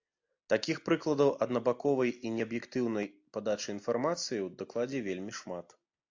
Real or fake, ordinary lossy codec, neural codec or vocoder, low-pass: real; AAC, 48 kbps; none; 7.2 kHz